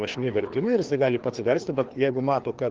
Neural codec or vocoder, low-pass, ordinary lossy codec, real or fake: codec, 16 kHz, 2 kbps, FreqCodec, larger model; 7.2 kHz; Opus, 16 kbps; fake